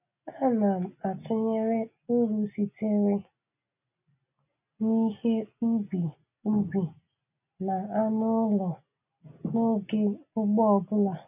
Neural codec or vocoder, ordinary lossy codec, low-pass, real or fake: none; none; 3.6 kHz; real